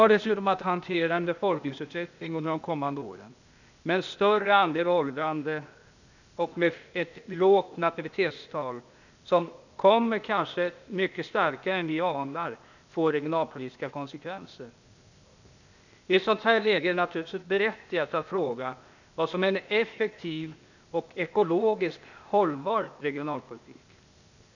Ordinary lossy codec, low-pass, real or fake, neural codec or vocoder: none; 7.2 kHz; fake; codec, 16 kHz, 0.8 kbps, ZipCodec